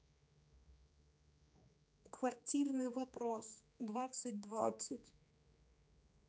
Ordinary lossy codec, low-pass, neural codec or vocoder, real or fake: none; none; codec, 16 kHz, 2 kbps, X-Codec, HuBERT features, trained on general audio; fake